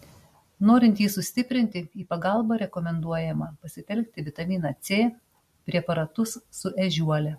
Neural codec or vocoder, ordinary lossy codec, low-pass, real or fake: none; MP3, 64 kbps; 14.4 kHz; real